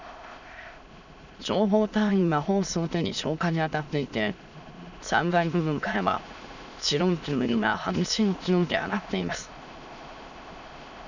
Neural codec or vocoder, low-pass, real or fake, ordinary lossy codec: autoencoder, 22.05 kHz, a latent of 192 numbers a frame, VITS, trained on many speakers; 7.2 kHz; fake; none